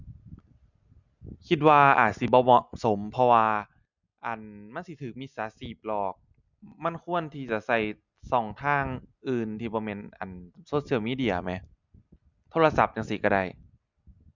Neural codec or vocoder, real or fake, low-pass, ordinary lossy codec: none; real; 7.2 kHz; none